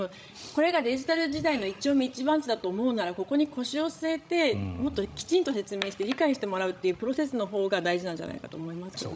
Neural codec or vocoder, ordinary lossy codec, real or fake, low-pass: codec, 16 kHz, 16 kbps, FreqCodec, larger model; none; fake; none